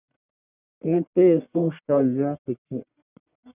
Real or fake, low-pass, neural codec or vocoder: fake; 3.6 kHz; codec, 44.1 kHz, 1.7 kbps, Pupu-Codec